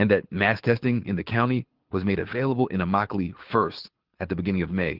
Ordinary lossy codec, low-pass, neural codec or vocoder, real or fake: Opus, 16 kbps; 5.4 kHz; codec, 24 kHz, 6 kbps, HILCodec; fake